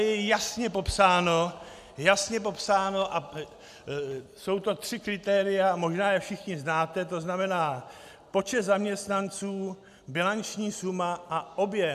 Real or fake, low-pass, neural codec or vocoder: real; 14.4 kHz; none